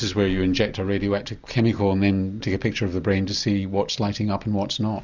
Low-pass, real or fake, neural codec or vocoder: 7.2 kHz; real; none